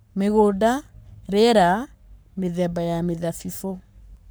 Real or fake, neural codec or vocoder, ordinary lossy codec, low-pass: fake; codec, 44.1 kHz, 7.8 kbps, Pupu-Codec; none; none